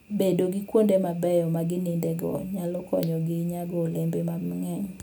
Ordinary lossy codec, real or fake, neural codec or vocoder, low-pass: none; real; none; none